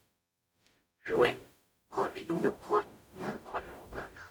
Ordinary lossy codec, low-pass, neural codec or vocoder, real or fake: none; none; codec, 44.1 kHz, 0.9 kbps, DAC; fake